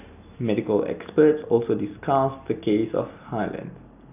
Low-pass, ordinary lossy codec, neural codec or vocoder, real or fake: 3.6 kHz; none; none; real